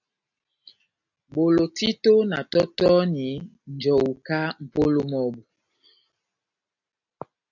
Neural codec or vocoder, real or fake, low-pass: none; real; 7.2 kHz